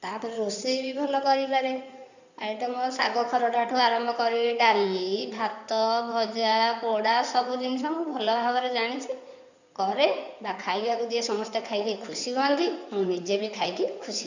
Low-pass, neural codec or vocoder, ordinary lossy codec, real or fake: 7.2 kHz; codec, 16 kHz in and 24 kHz out, 2.2 kbps, FireRedTTS-2 codec; none; fake